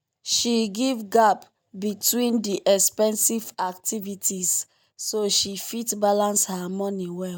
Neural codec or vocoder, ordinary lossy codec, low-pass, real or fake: none; none; none; real